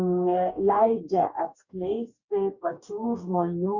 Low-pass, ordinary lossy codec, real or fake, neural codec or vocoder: 7.2 kHz; Opus, 64 kbps; fake; codec, 44.1 kHz, 2.6 kbps, DAC